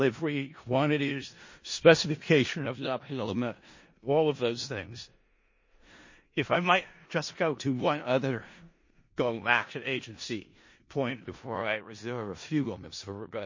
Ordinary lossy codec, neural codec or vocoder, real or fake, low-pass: MP3, 32 kbps; codec, 16 kHz in and 24 kHz out, 0.4 kbps, LongCat-Audio-Codec, four codebook decoder; fake; 7.2 kHz